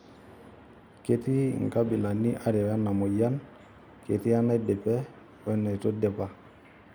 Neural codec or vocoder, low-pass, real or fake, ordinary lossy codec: none; none; real; none